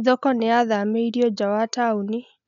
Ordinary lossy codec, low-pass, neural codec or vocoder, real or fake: none; 7.2 kHz; none; real